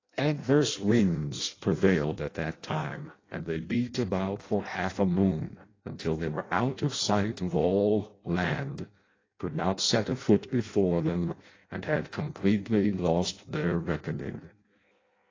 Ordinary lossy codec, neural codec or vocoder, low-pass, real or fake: AAC, 32 kbps; codec, 16 kHz in and 24 kHz out, 0.6 kbps, FireRedTTS-2 codec; 7.2 kHz; fake